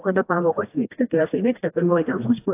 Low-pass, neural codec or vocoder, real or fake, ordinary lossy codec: 3.6 kHz; codec, 16 kHz, 1 kbps, FreqCodec, smaller model; fake; AAC, 24 kbps